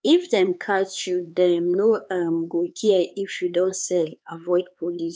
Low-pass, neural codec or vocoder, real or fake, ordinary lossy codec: none; codec, 16 kHz, 4 kbps, X-Codec, HuBERT features, trained on LibriSpeech; fake; none